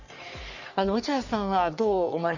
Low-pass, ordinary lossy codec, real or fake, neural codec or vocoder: 7.2 kHz; none; fake; codec, 44.1 kHz, 3.4 kbps, Pupu-Codec